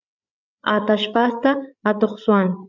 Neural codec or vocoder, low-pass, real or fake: codec, 16 kHz, 8 kbps, FreqCodec, larger model; 7.2 kHz; fake